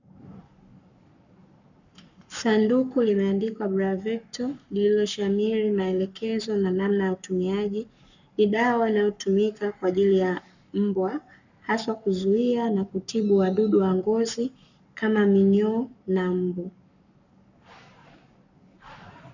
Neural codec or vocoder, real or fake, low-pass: codec, 44.1 kHz, 7.8 kbps, Pupu-Codec; fake; 7.2 kHz